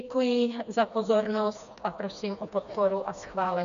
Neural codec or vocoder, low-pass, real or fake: codec, 16 kHz, 2 kbps, FreqCodec, smaller model; 7.2 kHz; fake